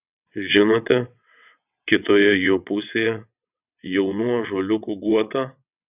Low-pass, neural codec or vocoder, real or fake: 3.6 kHz; vocoder, 22.05 kHz, 80 mel bands, WaveNeXt; fake